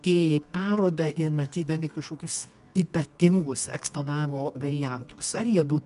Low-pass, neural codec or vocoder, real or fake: 10.8 kHz; codec, 24 kHz, 0.9 kbps, WavTokenizer, medium music audio release; fake